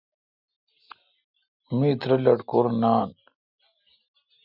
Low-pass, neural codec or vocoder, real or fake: 5.4 kHz; none; real